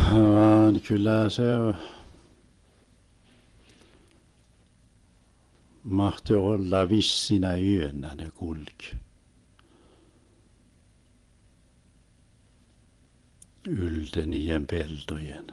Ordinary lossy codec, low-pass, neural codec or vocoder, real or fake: Opus, 24 kbps; 10.8 kHz; vocoder, 24 kHz, 100 mel bands, Vocos; fake